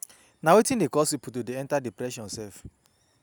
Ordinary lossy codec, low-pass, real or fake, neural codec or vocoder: none; none; real; none